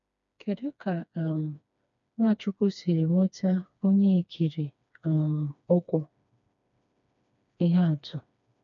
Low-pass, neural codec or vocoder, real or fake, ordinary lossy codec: 7.2 kHz; codec, 16 kHz, 2 kbps, FreqCodec, smaller model; fake; none